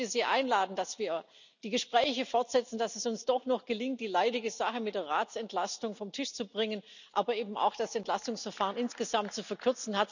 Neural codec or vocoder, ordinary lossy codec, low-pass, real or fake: none; none; 7.2 kHz; real